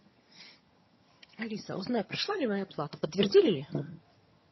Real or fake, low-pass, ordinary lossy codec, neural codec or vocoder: fake; 7.2 kHz; MP3, 24 kbps; vocoder, 22.05 kHz, 80 mel bands, HiFi-GAN